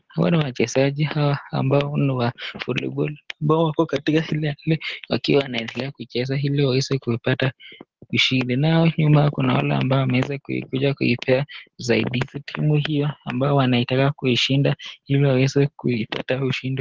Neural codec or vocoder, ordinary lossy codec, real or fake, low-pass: none; Opus, 16 kbps; real; 7.2 kHz